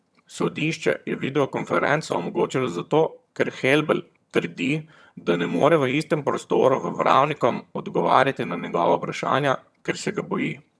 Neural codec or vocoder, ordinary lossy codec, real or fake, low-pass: vocoder, 22.05 kHz, 80 mel bands, HiFi-GAN; none; fake; none